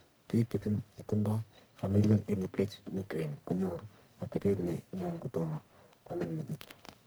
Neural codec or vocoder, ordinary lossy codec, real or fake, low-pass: codec, 44.1 kHz, 1.7 kbps, Pupu-Codec; none; fake; none